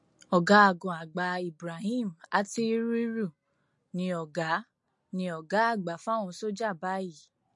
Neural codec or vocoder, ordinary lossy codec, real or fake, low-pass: none; MP3, 48 kbps; real; 10.8 kHz